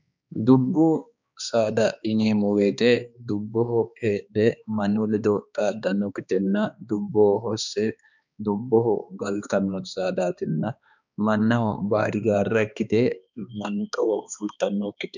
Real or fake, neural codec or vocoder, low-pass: fake; codec, 16 kHz, 2 kbps, X-Codec, HuBERT features, trained on balanced general audio; 7.2 kHz